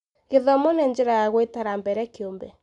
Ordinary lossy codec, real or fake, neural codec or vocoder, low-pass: none; real; none; 10.8 kHz